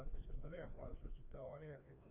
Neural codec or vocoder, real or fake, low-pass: codec, 16 kHz, 2 kbps, FreqCodec, larger model; fake; 3.6 kHz